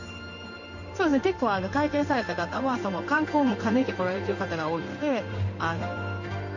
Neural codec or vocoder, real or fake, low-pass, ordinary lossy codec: codec, 16 kHz in and 24 kHz out, 1 kbps, XY-Tokenizer; fake; 7.2 kHz; none